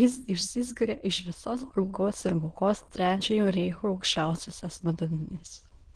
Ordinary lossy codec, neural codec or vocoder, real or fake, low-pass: Opus, 16 kbps; autoencoder, 22.05 kHz, a latent of 192 numbers a frame, VITS, trained on many speakers; fake; 9.9 kHz